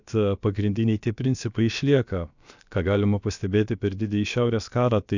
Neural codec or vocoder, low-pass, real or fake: codec, 16 kHz, about 1 kbps, DyCAST, with the encoder's durations; 7.2 kHz; fake